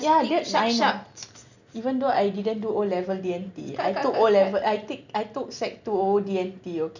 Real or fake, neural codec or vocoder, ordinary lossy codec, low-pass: real; none; none; 7.2 kHz